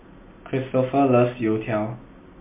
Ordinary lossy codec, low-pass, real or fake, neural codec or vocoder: MP3, 24 kbps; 3.6 kHz; real; none